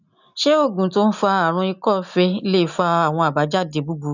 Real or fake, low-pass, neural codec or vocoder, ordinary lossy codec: real; 7.2 kHz; none; none